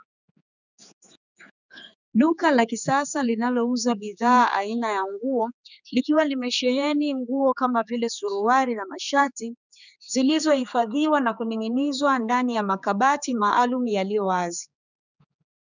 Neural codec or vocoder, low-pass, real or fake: codec, 16 kHz, 4 kbps, X-Codec, HuBERT features, trained on general audio; 7.2 kHz; fake